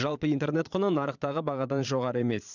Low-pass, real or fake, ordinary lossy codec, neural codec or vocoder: 7.2 kHz; fake; none; vocoder, 22.05 kHz, 80 mel bands, Vocos